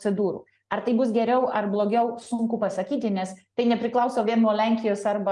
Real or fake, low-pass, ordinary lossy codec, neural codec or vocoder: real; 10.8 kHz; Opus, 32 kbps; none